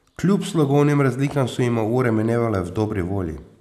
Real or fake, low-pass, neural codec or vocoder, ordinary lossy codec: real; 14.4 kHz; none; none